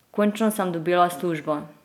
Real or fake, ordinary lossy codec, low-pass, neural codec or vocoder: real; none; 19.8 kHz; none